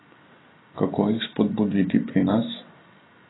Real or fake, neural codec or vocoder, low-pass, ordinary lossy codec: real; none; 7.2 kHz; AAC, 16 kbps